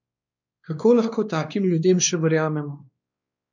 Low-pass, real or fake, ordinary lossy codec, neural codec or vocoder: 7.2 kHz; fake; none; codec, 16 kHz, 2 kbps, X-Codec, WavLM features, trained on Multilingual LibriSpeech